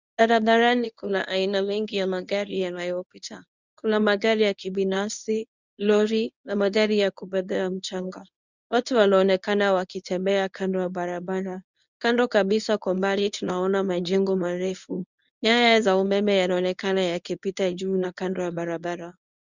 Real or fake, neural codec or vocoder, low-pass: fake; codec, 24 kHz, 0.9 kbps, WavTokenizer, medium speech release version 1; 7.2 kHz